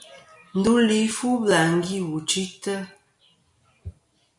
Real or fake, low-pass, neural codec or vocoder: real; 10.8 kHz; none